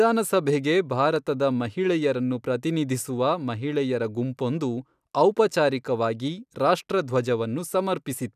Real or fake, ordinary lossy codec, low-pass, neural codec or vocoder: real; none; 14.4 kHz; none